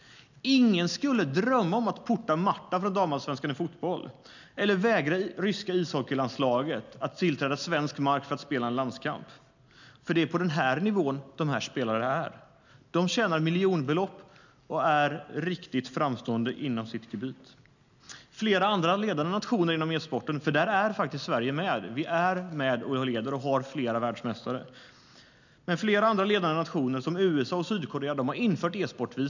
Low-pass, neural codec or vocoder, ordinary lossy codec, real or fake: 7.2 kHz; none; none; real